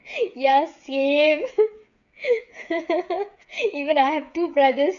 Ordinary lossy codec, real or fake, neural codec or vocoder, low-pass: none; fake; codec, 16 kHz, 8 kbps, FreqCodec, smaller model; 7.2 kHz